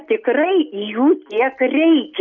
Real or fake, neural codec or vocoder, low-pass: real; none; 7.2 kHz